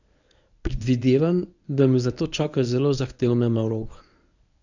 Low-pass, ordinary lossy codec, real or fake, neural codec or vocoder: 7.2 kHz; none; fake; codec, 24 kHz, 0.9 kbps, WavTokenizer, medium speech release version 1